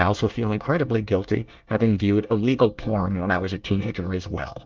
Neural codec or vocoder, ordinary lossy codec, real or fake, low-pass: codec, 24 kHz, 1 kbps, SNAC; Opus, 24 kbps; fake; 7.2 kHz